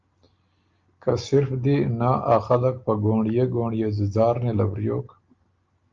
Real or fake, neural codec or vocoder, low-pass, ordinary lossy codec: real; none; 7.2 kHz; Opus, 24 kbps